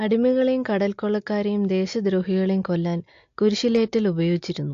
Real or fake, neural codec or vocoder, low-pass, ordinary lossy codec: real; none; 7.2 kHz; AAC, 48 kbps